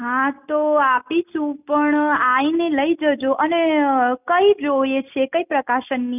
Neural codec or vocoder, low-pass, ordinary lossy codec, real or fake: none; 3.6 kHz; none; real